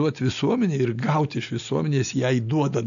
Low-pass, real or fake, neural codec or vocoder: 7.2 kHz; real; none